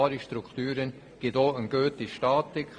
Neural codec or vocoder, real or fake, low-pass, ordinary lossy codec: vocoder, 44.1 kHz, 128 mel bands every 512 samples, BigVGAN v2; fake; 9.9 kHz; Opus, 64 kbps